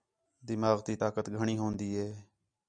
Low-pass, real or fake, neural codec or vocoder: 9.9 kHz; real; none